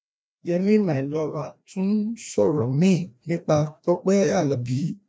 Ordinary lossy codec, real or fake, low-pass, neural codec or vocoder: none; fake; none; codec, 16 kHz, 1 kbps, FreqCodec, larger model